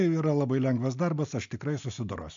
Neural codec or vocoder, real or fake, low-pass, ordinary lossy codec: none; real; 7.2 kHz; MP3, 64 kbps